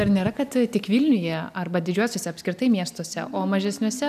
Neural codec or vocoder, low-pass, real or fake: none; 14.4 kHz; real